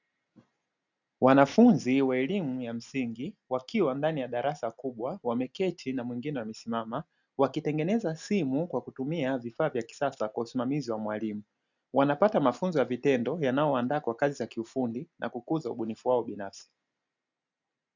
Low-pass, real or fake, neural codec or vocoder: 7.2 kHz; real; none